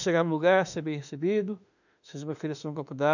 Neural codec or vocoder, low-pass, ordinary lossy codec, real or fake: autoencoder, 48 kHz, 32 numbers a frame, DAC-VAE, trained on Japanese speech; 7.2 kHz; none; fake